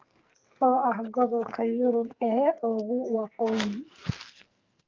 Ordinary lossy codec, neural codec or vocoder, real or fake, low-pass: Opus, 32 kbps; codec, 44.1 kHz, 2.6 kbps, SNAC; fake; 7.2 kHz